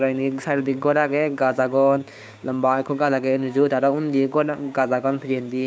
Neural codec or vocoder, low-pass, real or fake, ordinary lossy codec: codec, 16 kHz, 6 kbps, DAC; none; fake; none